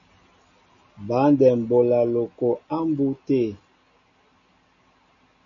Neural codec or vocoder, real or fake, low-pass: none; real; 7.2 kHz